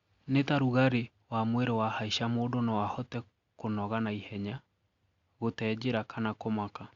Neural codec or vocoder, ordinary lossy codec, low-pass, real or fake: none; Opus, 64 kbps; 7.2 kHz; real